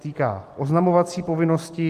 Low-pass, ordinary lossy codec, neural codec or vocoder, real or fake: 14.4 kHz; Opus, 24 kbps; none; real